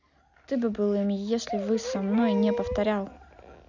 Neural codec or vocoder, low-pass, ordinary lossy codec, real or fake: none; 7.2 kHz; none; real